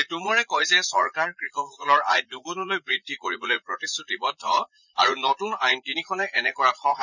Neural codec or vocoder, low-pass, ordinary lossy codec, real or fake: vocoder, 44.1 kHz, 80 mel bands, Vocos; 7.2 kHz; none; fake